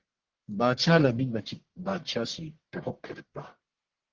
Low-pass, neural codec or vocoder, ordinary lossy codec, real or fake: 7.2 kHz; codec, 44.1 kHz, 1.7 kbps, Pupu-Codec; Opus, 16 kbps; fake